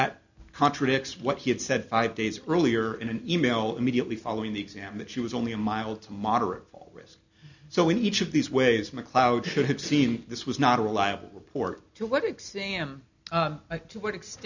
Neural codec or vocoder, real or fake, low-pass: none; real; 7.2 kHz